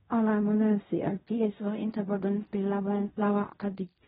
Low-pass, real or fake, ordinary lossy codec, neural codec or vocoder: 10.8 kHz; fake; AAC, 16 kbps; codec, 16 kHz in and 24 kHz out, 0.4 kbps, LongCat-Audio-Codec, fine tuned four codebook decoder